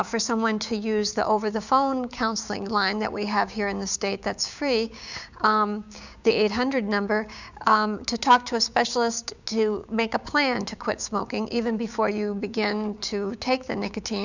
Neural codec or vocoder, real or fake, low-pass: codec, 16 kHz, 6 kbps, DAC; fake; 7.2 kHz